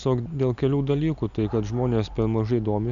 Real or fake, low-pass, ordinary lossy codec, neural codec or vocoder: real; 7.2 kHz; MP3, 96 kbps; none